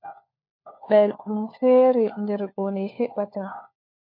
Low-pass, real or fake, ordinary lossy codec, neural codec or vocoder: 5.4 kHz; fake; MP3, 32 kbps; codec, 16 kHz, 4 kbps, FunCodec, trained on LibriTTS, 50 frames a second